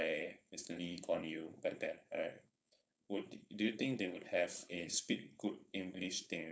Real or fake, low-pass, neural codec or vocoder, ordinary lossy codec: fake; none; codec, 16 kHz, 4.8 kbps, FACodec; none